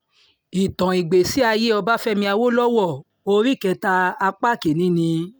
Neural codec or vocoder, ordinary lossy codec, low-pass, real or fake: none; none; none; real